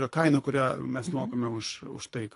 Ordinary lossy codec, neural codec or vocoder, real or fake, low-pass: AAC, 48 kbps; codec, 24 kHz, 3 kbps, HILCodec; fake; 10.8 kHz